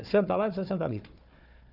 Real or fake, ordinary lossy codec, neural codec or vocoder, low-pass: fake; none; codec, 16 kHz, 4 kbps, FunCodec, trained on Chinese and English, 50 frames a second; 5.4 kHz